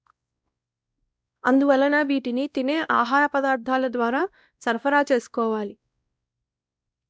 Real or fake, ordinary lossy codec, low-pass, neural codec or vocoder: fake; none; none; codec, 16 kHz, 1 kbps, X-Codec, WavLM features, trained on Multilingual LibriSpeech